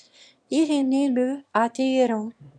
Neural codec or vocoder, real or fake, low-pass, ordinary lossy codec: autoencoder, 22.05 kHz, a latent of 192 numbers a frame, VITS, trained on one speaker; fake; 9.9 kHz; MP3, 96 kbps